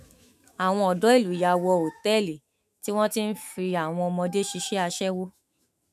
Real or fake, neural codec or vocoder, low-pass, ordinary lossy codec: fake; autoencoder, 48 kHz, 128 numbers a frame, DAC-VAE, trained on Japanese speech; 14.4 kHz; MP3, 96 kbps